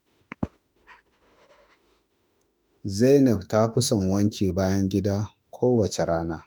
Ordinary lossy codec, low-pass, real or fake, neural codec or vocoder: none; none; fake; autoencoder, 48 kHz, 32 numbers a frame, DAC-VAE, trained on Japanese speech